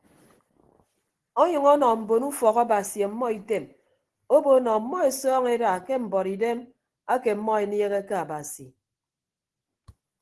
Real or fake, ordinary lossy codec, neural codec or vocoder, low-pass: real; Opus, 16 kbps; none; 10.8 kHz